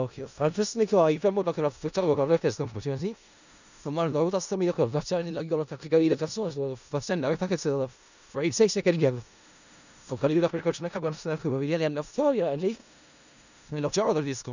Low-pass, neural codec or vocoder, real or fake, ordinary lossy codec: 7.2 kHz; codec, 16 kHz in and 24 kHz out, 0.4 kbps, LongCat-Audio-Codec, four codebook decoder; fake; none